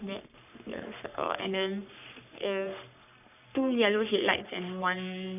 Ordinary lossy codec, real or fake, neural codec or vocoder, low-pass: none; fake; codec, 44.1 kHz, 3.4 kbps, Pupu-Codec; 3.6 kHz